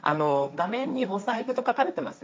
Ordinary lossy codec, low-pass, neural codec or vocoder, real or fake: none; none; codec, 16 kHz, 1.1 kbps, Voila-Tokenizer; fake